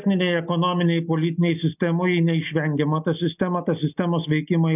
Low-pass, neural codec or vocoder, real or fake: 3.6 kHz; none; real